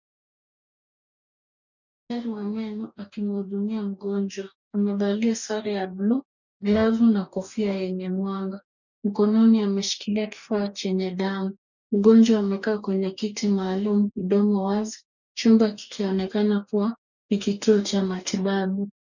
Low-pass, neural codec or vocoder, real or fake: 7.2 kHz; codec, 44.1 kHz, 2.6 kbps, DAC; fake